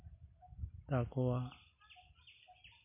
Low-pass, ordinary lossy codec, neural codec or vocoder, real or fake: 3.6 kHz; MP3, 24 kbps; none; real